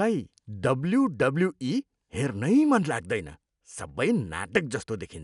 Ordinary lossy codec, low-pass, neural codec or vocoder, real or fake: none; 10.8 kHz; none; real